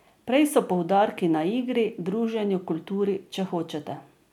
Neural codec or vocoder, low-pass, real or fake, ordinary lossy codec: none; 19.8 kHz; real; none